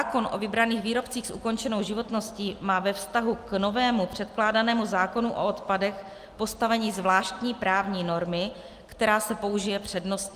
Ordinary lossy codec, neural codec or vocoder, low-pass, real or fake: Opus, 32 kbps; none; 14.4 kHz; real